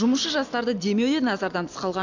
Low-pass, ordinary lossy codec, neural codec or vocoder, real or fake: 7.2 kHz; none; none; real